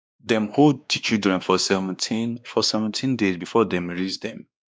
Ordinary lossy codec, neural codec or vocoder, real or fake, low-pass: none; codec, 16 kHz, 2 kbps, X-Codec, WavLM features, trained on Multilingual LibriSpeech; fake; none